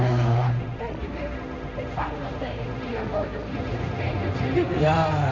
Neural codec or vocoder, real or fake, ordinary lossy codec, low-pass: codec, 16 kHz, 1.1 kbps, Voila-Tokenizer; fake; none; 7.2 kHz